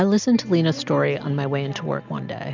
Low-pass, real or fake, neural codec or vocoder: 7.2 kHz; real; none